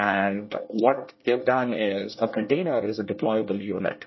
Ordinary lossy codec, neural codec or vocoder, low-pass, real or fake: MP3, 24 kbps; codec, 24 kHz, 1 kbps, SNAC; 7.2 kHz; fake